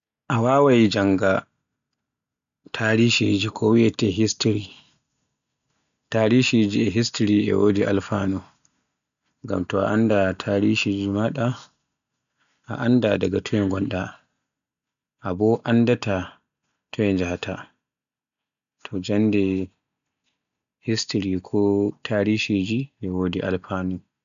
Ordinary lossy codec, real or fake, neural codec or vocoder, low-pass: none; real; none; 7.2 kHz